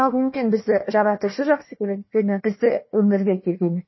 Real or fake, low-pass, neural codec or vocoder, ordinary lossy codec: fake; 7.2 kHz; codec, 16 kHz, 1 kbps, FunCodec, trained on Chinese and English, 50 frames a second; MP3, 24 kbps